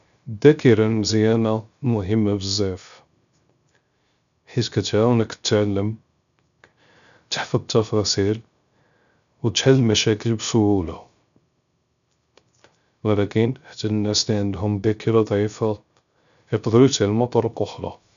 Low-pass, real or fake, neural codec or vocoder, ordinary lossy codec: 7.2 kHz; fake; codec, 16 kHz, 0.3 kbps, FocalCodec; none